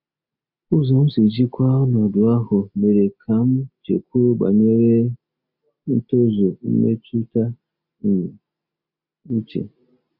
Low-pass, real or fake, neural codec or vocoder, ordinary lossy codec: 5.4 kHz; real; none; none